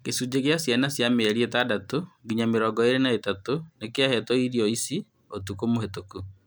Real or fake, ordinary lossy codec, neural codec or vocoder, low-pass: real; none; none; none